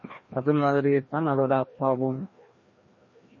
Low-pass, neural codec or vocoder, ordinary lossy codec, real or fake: 7.2 kHz; codec, 16 kHz, 1 kbps, FreqCodec, larger model; MP3, 32 kbps; fake